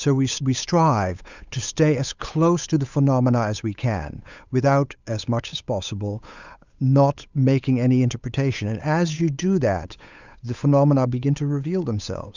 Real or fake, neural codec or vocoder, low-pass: fake; codec, 16 kHz, 8 kbps, FunCodec, trained on Chinese and English, 25 frames a second; 7.2 kHz